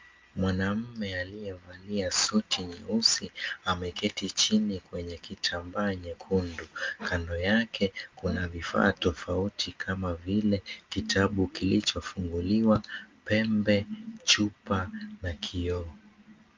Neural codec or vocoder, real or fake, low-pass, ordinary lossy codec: none; real; 7.2 kHz; Opus, 32 kbps